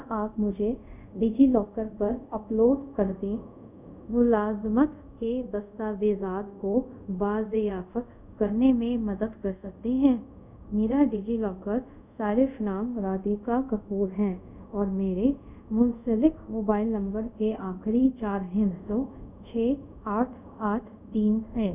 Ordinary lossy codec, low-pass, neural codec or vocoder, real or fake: none; 3.6 kHz; codec, 24 kHz, 0.5 kbps, DualCodec; fake